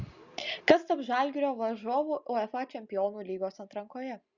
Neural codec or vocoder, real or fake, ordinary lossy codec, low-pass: none; real; Opus, 64 kbps; 7.2 kHz